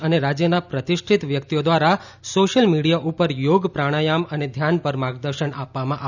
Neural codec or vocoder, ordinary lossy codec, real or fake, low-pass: none; none; real; 7.2 kHz